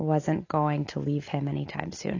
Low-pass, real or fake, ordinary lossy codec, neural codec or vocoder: 7.2 kHz; real; AAC, 32 kbps; none